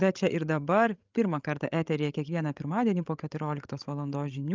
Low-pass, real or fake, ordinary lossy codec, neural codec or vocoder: 7.2 kHz; fake; Opus, 24 kbps; codec, 16 kHz, 16 kbps, FreqCodec, larger model